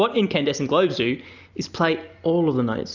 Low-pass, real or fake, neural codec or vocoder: 7.2 kHz; real; none